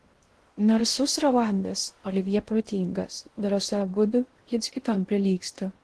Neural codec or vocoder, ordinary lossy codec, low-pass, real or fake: codec, 16 kHz in and 24 kHz out, 0.6 kbps, FocalCodec, streaming, 2048 codes; Opus, 16 kbps; 10.8 kHz; fake